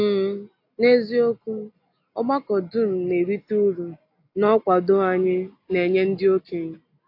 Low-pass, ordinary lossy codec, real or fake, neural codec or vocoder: 5.4 kHz; AAC, 32 kbps; real; none